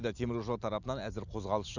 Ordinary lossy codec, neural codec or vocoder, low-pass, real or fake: none; none; 7.2 kHz; real